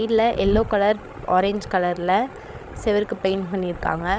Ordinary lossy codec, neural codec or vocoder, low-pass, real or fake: none; codec, 16 kHz, 16 kbps, FreqCodec, larger model; none; fake